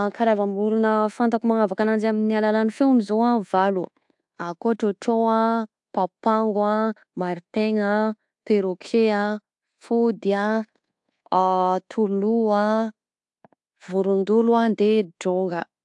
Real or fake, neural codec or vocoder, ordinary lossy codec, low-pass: fake; codec, 24 kHz, 1.2 kbps, DualCodec; AAC, 64 kbps; 10.8 kHz